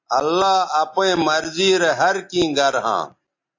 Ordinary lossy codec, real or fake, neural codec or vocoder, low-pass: AAC, 48 kbps; real; none; 7.2 kHz